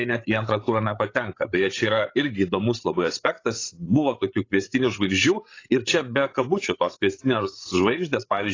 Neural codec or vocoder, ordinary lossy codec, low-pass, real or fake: codec, 16 kHz, 16 kbps, FreqCodec, larger model; AAC, 32 kbps; 7.2 kHz; fake